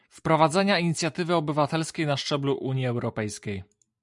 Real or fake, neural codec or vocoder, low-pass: real; none; 10.8 kHz